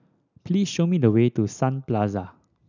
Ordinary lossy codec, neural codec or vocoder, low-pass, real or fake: none; none; 7.2 kHz; real